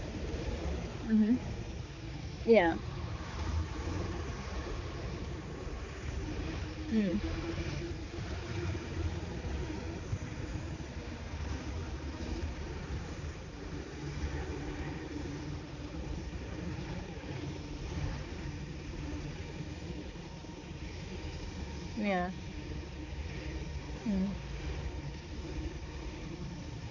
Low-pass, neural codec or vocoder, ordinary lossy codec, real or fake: 7.2 kHz; codec, 16 kHz, 4 kbps, X-Codec, HuBERT features, trained on balanced general audio; none; fake